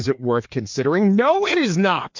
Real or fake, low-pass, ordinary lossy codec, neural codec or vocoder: fake; 7.2 kHz; MP3, 48 kbps; codec, 16 kHz, 2 kbps, FreqCodec, larger model